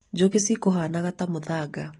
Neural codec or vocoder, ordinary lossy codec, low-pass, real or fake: none; AAC, 32 kbps; 10.8 kHz; real